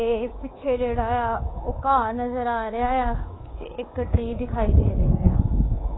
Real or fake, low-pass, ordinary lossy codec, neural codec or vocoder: fake; 7.2 kHz; AAC, 16 kbps; codec, 24 kHz, 3.1 kbps, DualCodec